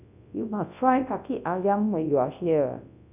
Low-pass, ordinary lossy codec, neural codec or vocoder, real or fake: 3.6 kHz; none; codec, 24 kHz, 0.9 kbps, WavTokenizer, large speech release; fake